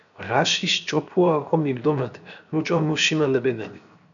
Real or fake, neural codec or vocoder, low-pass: fake; codec, 16 kHz, 0.7 kbps, FocalCodec; 7.2 kHz